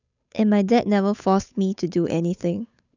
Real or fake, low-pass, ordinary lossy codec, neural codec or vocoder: fake; 7.2 kHz; none; codec, 16 kHz, 8 kbps, FunCodec, trained on Chinese and English, 25 frames a second